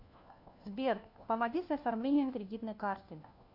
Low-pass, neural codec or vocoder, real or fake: 5.4 kHz; codec, 16 kHz, 1 kbps, FunCodec, trained on LibriTTS, 50 frames a second; fake